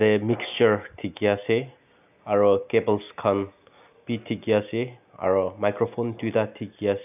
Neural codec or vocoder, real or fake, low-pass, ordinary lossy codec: none; real; 3.6 kHz; none